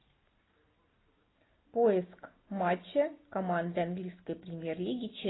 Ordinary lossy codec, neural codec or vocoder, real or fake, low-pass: AAC, 16 kbps; none; real; 7.2 kHz